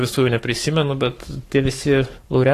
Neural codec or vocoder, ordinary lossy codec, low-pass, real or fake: codec, 44.1 kHz, 7.8 kbps, Pupu-Codec; AAC, 48 kbps; 14.4 kHz; fake